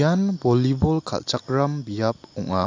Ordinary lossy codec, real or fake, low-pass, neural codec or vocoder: AAC, 48 kbps; real; 7.2 kHz; none